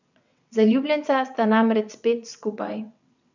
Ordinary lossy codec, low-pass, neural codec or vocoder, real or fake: none; 7.2 kHz; vocoder, 22.05 kHz, 80 mel bands, WaveNeXt; fake